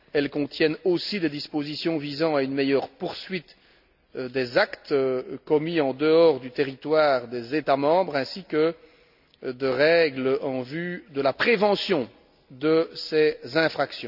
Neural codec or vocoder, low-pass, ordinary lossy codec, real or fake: none; 5.4 kHz; none; real